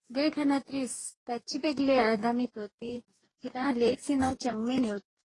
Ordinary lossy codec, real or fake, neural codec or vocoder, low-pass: AAC, 32 kbps; fake; codec, 44.1 kHz, 2.6 kbps, DAC; 10.8 kHz